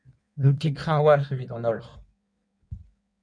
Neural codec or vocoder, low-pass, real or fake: codec, 16 kHz in and 24 kHz out, 1.1 kbps, FireRedTTS-2 codec; 9.9 kHz; fake